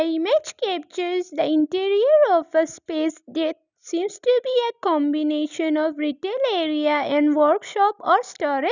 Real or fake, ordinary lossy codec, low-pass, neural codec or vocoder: real; none; 7.2 kHz; none